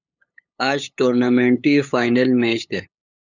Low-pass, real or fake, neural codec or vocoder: 7.2 kHz; fake; codec, 16 kHz, 8 kbps, FunCodec, trained on LibriTTS, 25 frames a second